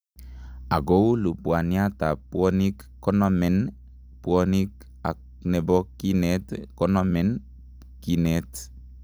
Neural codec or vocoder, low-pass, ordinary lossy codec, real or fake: none; none; none; real